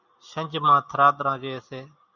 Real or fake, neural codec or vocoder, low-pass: real; none; 7.2 kHz